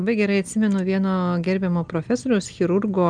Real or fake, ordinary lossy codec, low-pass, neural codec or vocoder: real; Opus, 32 kbps; 9.9 kHz; none